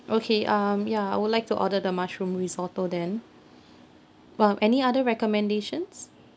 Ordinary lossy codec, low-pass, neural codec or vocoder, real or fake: none; none; none; real